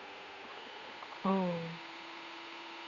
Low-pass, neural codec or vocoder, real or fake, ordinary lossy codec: 7.2 kHz; codec, 16 kHz, 8 kbps, FunCodec, trained on Chinese and English, 25 frames a second; fake; none